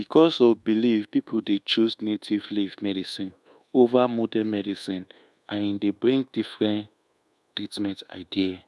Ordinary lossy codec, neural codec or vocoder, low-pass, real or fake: none; codec, 24 kHz, 1.2 kbps, DualCodec; none; fake